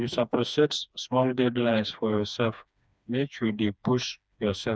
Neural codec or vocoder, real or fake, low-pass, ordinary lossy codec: codec, 16 kHz, 2 kbps, FreqCodec, smaller model; fake; none; none